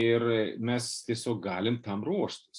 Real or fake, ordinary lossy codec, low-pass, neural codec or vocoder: real; AAC, 64 kbps; 10.8 kHz; none